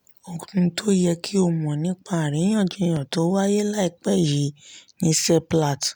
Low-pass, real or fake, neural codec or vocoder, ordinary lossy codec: none; real; none; none